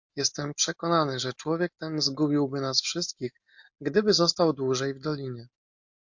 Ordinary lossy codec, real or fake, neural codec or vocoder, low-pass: MP3, 48 kbps; real; none; 7.2 kHz